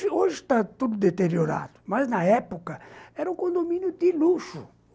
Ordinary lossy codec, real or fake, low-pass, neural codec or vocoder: none; real; none; none